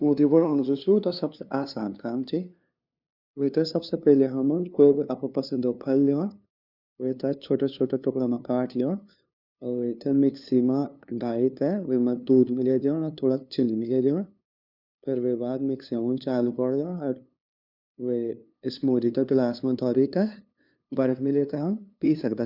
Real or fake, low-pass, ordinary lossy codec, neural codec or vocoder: fake; 5.4 kHz; none; codec, 16 kHz, 2 kbps, FunCodec, trained on LibriTTS, 25 frames a second